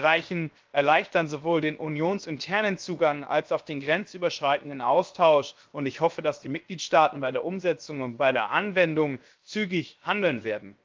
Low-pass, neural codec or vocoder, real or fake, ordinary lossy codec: 7.2 kHz; codec, 16 kHz, 0.7 kbps, FocalCodec; fake; Opus, 24 kbps